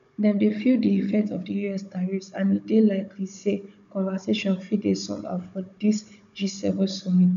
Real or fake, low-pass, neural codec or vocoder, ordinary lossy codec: fake; 7.2 kHz; codec, 16 kHz, 16 kbps, FunCodec, trained on Chinese and English, 50 frames a second; none